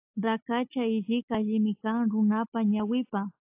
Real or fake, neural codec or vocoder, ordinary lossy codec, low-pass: real; none; AAC, 32 kbps; 3.6 kHz